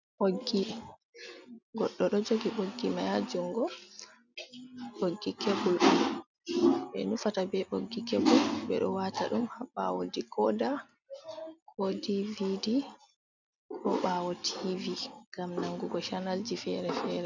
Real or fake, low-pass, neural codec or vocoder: real; 7.2 kHz; none